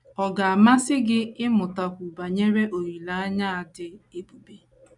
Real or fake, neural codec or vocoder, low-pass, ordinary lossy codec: real; none; 10.8 kHz; none